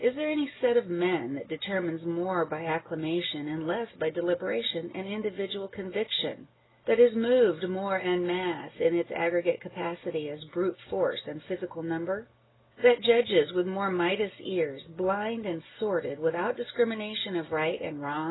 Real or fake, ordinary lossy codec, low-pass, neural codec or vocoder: real; AAC, 16 kbps; 7.2 kHz; none